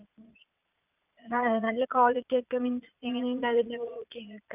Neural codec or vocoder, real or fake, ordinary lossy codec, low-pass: vocoder, 22.05 kHz, 80 mel bands, Vocos; fake; none; 3.6 kHz